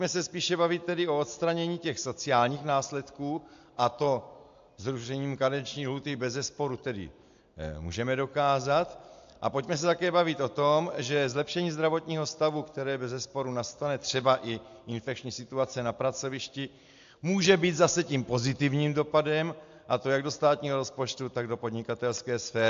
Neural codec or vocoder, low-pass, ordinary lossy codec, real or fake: none; 7.2 kHz; AAC, 64 kbps; real